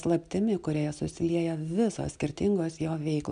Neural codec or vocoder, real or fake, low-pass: none; real; 9.9 kHz